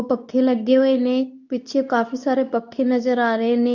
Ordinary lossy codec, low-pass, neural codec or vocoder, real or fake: none; 7.2 kHz; codec, 24 kHz, 0.9 kbps, WavTokenizer, medium speech release version 2; fake